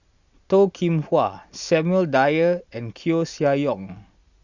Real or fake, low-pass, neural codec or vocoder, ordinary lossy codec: real; 7.2 kHz; none; Opus, 64 kbps